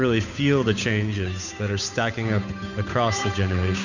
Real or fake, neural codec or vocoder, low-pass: fake; codec, 16 kHz, 8 kbps, FunCodec, trained on Chinese and English, 25 frames a second; 7.2 kHz